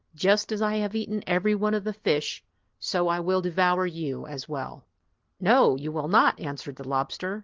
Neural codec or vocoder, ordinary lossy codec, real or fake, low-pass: none; Opus, 16 kbps; real; 7.2 kHz